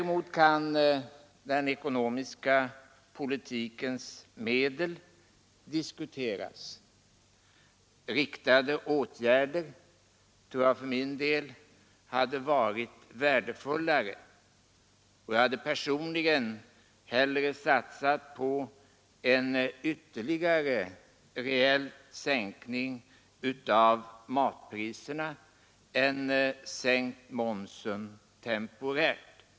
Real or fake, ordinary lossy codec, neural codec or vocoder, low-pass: real; none; none; none